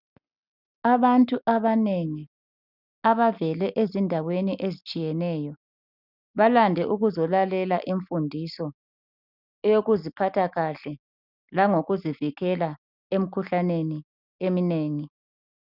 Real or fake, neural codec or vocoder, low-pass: real; none; 5.4 kHz